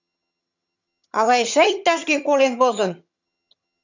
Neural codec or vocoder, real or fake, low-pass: vocoder, 22.05 kHz, 80 mel bands, HiFi-GAN; fake; 7.2 kHz